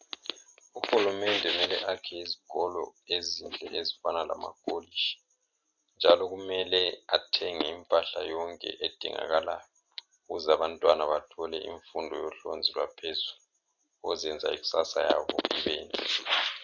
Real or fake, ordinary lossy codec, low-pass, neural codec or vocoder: real; AAC, 48 kbps; 7.2 kHz; none